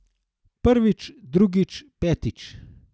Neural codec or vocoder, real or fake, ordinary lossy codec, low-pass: none; real; none; none